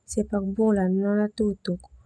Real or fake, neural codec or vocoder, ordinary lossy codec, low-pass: real; none; none; none